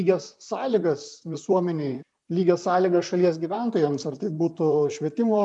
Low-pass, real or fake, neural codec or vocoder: 10.8 kHz; fake; vocoder, 44.1 kHz, 128 mel bands, Pupu-Vocoder